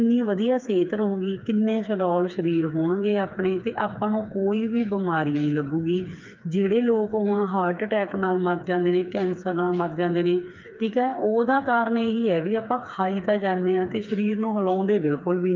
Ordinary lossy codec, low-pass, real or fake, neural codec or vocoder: Opus, 24 kbps; 7.2 kHz; fake; codec, 16 kHz, 4 kbps, FreqCodec, smaller model